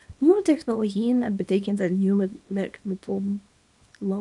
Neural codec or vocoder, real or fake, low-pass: codec, 24 kHz, 0.9 kbps, WavTokenizer, small release; fake; 10.8 kHz